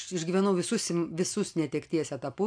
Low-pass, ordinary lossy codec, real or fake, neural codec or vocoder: 9.9 kHz; AAC, 64 kbps; real; none